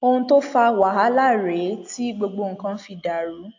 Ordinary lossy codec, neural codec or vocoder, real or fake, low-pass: AAC, 48 kbps; none; real; 7.2 kHz